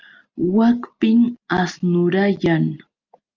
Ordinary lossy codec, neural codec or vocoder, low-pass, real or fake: Opus, 24 kbps; none; 7.2 kHz; real